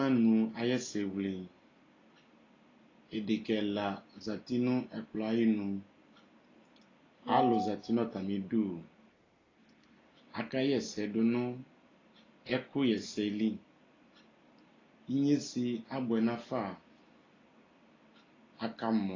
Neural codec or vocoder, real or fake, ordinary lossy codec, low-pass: none; real; AAC, 32 kbps; 7.2 kHz